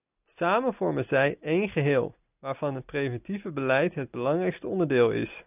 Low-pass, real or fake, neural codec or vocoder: 3.6 kHz; real; none